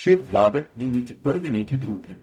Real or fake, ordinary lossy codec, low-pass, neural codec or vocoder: fake; none; 19.8 kHz; codec, 44.1 kHz, 0.9 kbps, DAC